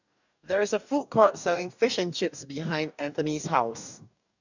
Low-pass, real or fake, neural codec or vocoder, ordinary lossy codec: 7.2 kHz; fake; codec, 44.1 kHz, 2.6 kbps, DAC; none